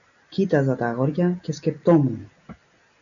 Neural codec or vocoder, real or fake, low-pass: none; real; 7.2 kHz